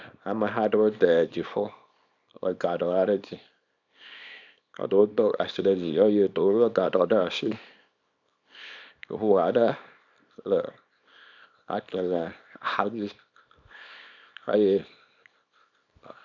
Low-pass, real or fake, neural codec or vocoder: 7.2 kHz; fake; codec, 24 kHz, 0.9 kbps, WavTokenizer, small release